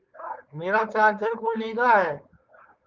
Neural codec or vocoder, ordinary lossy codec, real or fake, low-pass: codec, 16 kHz, 4.8 kbps, FACodec; Opus, 32 kbps; fake; 7.2 kHz